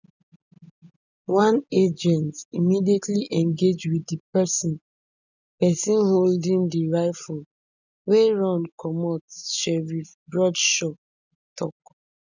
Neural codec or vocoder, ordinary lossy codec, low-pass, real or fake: none; none; 7.2 kHz; real